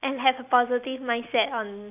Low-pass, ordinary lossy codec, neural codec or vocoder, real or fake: 3.6 kHz; none; none; real